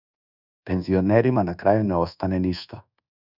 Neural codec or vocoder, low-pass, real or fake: codec, 24 kHz, 1.2 kbps, DualCodec; 5.4 kHz; fake